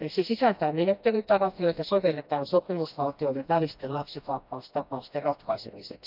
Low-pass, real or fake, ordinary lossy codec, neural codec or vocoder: 5.4 kHz; fake; none; codec, 16 kHz, 1 kbps, FreqCodec, smaller model